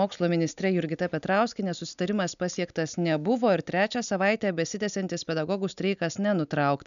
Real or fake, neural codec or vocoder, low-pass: real; none; 7.2 kHz